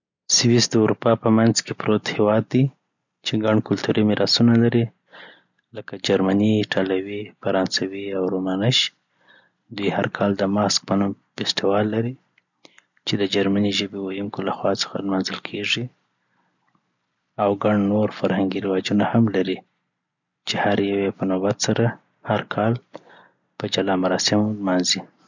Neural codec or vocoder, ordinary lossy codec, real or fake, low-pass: none; none; real; 7.2 kHz